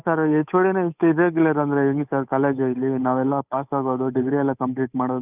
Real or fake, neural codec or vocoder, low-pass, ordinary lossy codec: fake; codec, 16 kHz, 8 kbps, FunCodec, trained on Chinese and English, 25 frames a second; 3.6 kHz; none